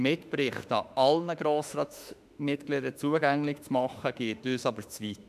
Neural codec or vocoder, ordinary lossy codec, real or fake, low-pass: autoencoder, 48 kHz, 32 numbers a frame, DAC-VAE, trained on Japanese speech; none; fake; 14.4 kHz